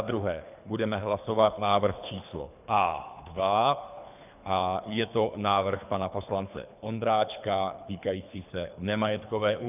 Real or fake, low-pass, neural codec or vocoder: fake; 3.6 kHz; codec, 24 kHz, 3 kbps, HILCodec